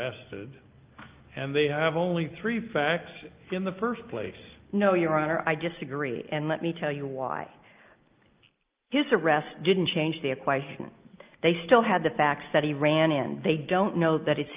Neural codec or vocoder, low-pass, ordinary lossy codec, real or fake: none; 3.6 kHz; Opus, 24 kbps; real